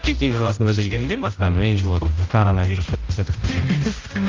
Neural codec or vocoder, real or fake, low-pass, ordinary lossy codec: codec, 16 kHz, 0.5 kbps, X-Codec, HuBERT features, trained on general audio; fake; 7.2 kHz; Opus, 24 kbps